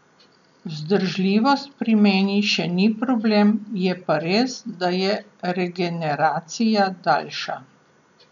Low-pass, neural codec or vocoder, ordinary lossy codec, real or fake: 7.2 kHz; none; none; real